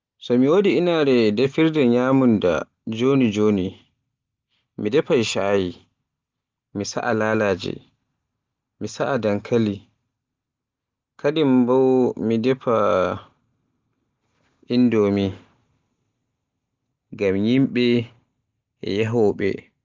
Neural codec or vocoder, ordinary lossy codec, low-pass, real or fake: none; Opus, 32 kbps; 7.2 kHz; real